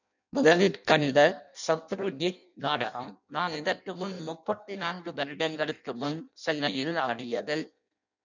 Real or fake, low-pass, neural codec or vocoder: fake; 7.2 kHz; codec, 16 kHz in and 24 kHz out, 0.6 kbps, FireRedTTS-2 codec